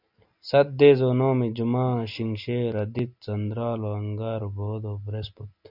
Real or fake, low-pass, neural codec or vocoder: real; 5.4 kHz; none